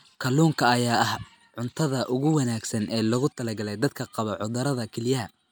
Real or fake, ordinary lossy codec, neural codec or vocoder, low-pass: real; none; none; none